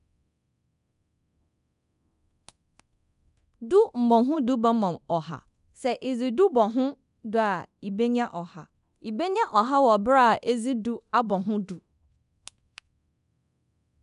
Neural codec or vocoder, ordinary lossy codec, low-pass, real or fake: codec, 24 kHz, 0.9 kbps, DualCodec; none; 10.8 kHz; fake